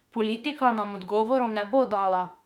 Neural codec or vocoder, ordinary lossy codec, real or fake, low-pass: autoencoder, 48 kHz, 32 numbers a frame, DAC-VAE, trained on Japanese speech; none; fake; 19.8 kHz